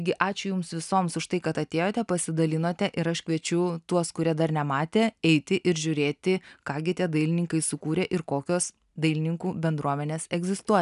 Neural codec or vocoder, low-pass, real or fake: none; 10.8 kHz; real